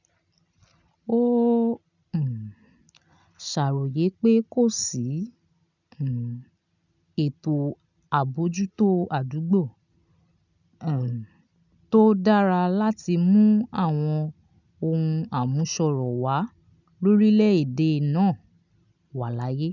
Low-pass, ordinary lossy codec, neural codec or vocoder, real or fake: 7.2 kHz; none; none; real